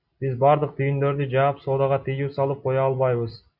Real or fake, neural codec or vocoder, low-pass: real; none; 5.4 kHz